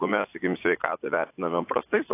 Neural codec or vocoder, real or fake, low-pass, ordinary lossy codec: vocoder, 44.1 kHz, 80 mel bands, Vocos; fake; 3.6 kHz; AAC, 24 kbps